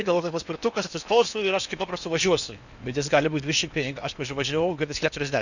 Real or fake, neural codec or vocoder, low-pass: fake; codec, 16 kHz in and 24 kHz out, 0.8 kbps, FocalCodec, streaming, 65536 codes; 7.2 kHz